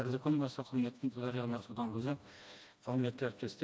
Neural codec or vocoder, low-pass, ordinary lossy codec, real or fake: codec, 16 kHz, 1 kbps, FreqCodec, smaller model; none; none; fake